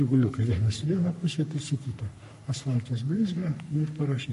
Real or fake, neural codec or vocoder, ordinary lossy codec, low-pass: fake; codec, 44.1 kHz, 3.4 kbps, Pupu-Codec; MP3, 48 kbps; 14.4 kHz